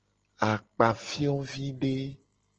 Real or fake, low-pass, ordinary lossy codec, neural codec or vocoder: real; 7.2 kHz; Opus, 16 kbps; none